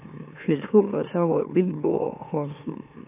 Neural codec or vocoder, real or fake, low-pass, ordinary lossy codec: autoencoder, 44.1 kHz, a latent of 192 numbers a frame, MeloTTS; fake; 3.6 kHz; AAC, 24 kbps